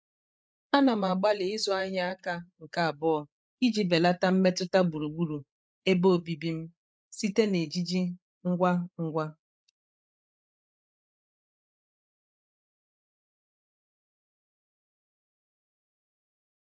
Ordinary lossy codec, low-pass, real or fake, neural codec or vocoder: none; none; fake; codec, 16 kHz, 8 kbps, FreqCodec, larger model